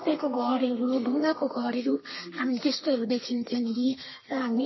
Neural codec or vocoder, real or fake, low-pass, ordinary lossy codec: codec, 32 kHz, 1.9 kbps, SNAC; fake; 7.2 kHz; MP3, 24 kbps